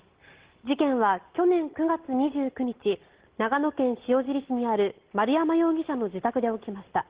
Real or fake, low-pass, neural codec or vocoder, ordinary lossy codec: real; 3.6 kHz; none; Opus, 16 kbps